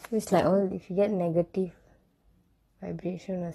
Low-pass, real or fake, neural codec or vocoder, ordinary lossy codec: 19.8 kHz; real; none; AAC, 32 kbps